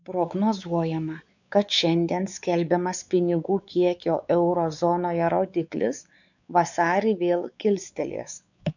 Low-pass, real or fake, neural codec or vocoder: 7.2 kHz; fake; codec, 16 kHz, 4 kbps, X-Codec, WavLM features, trained on Multilingual LibriSpeech